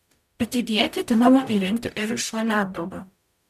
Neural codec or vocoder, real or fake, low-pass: codec, 44.1 kHz, 0.9 kbps, DAC; fake; 14.4 kHz